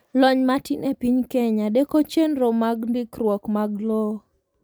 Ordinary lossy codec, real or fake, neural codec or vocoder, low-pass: none; real; none; 19.8 kHz